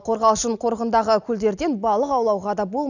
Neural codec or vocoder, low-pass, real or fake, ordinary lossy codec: none; 7.2 kHz; real; none